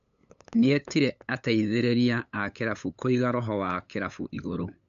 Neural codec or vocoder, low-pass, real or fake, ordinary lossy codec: codec, 16 kHz, 8 kbps, FunCodec, trained on LibriTTS, 25 frames a second; 7.2 kHz; fake; AAC, 96 kbps